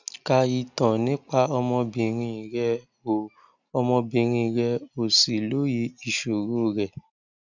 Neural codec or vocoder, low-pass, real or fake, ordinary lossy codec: none; 7.2 kHz; real; none